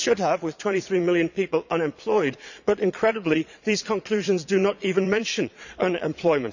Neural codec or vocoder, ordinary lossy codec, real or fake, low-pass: vocoder, 22.05 kHz, 80 mel bands, Vocos; none; fake; 7.2 kHz